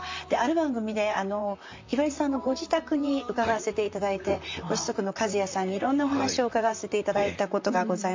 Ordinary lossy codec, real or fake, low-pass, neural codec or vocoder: MP3, 64 kbps; fake; 7.2 kHz; vocoder, 44.1 kHz, 128 mel bands, Pupu-Vocoder